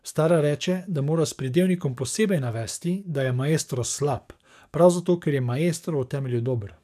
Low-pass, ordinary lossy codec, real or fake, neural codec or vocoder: 14.4 kHz; none; fake; codec, 44.1 kHz, 7.8 kbps, DAC